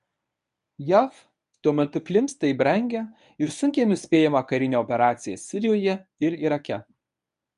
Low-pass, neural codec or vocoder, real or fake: 10.8 kHz; codec, 24 kHz, 0.9 kbps, WavTokenizer, medium speech release version 1; fake